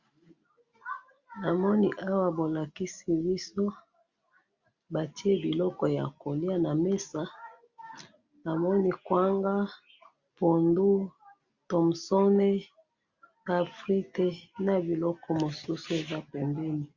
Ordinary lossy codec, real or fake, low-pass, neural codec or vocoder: Opus, 64 kbps; real; 7.2 kHz; none